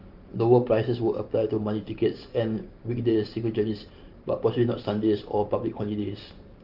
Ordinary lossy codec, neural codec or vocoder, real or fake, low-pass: Opus, 32 kbps; vocoder, 44.1 kHz, 128 mel bands every 512 samples, BigVGAN v2; fake; 5.4 kHz